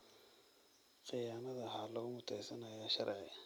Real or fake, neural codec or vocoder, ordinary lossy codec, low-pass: real; none; none; none